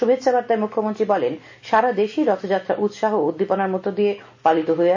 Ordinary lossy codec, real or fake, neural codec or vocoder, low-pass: AAC, 48 kbps; real; none; 7.2 kHz